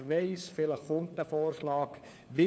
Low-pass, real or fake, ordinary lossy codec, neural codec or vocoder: none; fake; none; codec, 16 kHz, 4 kbps, FunCodec, trained on LibriTTS, 50 frames a second